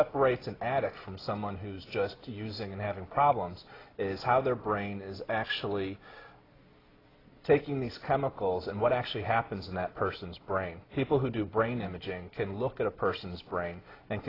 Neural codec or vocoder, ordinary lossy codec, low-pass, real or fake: none; AAC, 24 kbps; 5.4 kHz; real